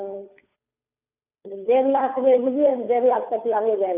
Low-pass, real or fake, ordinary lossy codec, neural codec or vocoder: 3.6 kHz; fake; none; codec, 16 kHz, 2 kbps, FunCodec, trained on Chinese and English, 25 frames a second